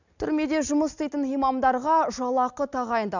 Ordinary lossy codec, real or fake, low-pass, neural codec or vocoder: none; real; 7.2 kHz; none